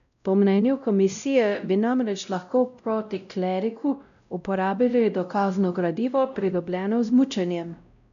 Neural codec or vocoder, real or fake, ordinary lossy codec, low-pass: codec, 16 kHz, 0.5 kbps, X-Codec, WavLM features, trained on Multilingual LibriSpeech; fake; none; 7.2 kHz